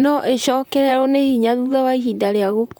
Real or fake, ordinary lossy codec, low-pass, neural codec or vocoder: fake; none; none; vocoder, 44.1 kHz, 128 mel bands, Pupu-Vocoder